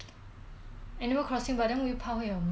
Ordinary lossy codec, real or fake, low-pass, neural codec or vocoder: none; real; none; none